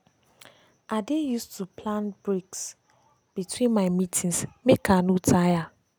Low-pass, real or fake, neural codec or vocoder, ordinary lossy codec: none; real; none; none